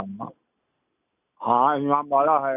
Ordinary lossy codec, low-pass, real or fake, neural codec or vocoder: none; 3.6 kHz; real; none